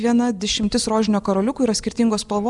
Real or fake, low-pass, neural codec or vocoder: real; 10.8 kHz; none